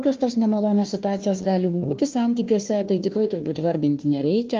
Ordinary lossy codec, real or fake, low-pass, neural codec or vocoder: Opus, 32 kbps; fake; 7.2 kHz; codec, 16 kHz, 1 kbps, FunCodec, trained on Chinese and English, 50 frames a second